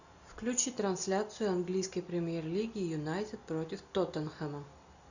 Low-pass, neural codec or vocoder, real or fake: 7.2 kHz; none; real